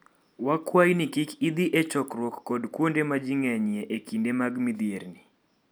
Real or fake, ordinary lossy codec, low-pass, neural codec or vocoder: real; none; none; none